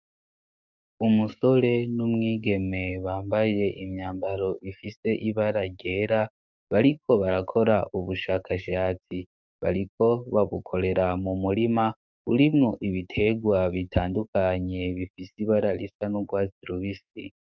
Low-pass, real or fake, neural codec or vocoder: 7.2 kHz; fake; codec, 44.1 kHz, 7.8 kbps, DAC